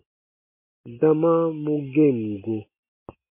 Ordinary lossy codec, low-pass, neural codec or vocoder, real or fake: MP3, 24 kbps; 3.6 kHz; none; real